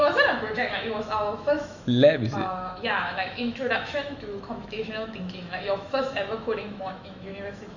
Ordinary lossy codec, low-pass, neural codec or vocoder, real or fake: none; 7.2 kHz; autoencoder, 48 kHz, 128 numbers a frame, DAC-VAE, trained on Japanese speech; fake